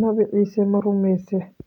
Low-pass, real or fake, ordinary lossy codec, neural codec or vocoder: 19.8 kHz; real; none; none